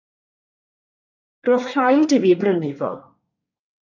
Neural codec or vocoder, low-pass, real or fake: codec, 24 kHz, 1 kbps, SNAC; 7.2 kHz; fake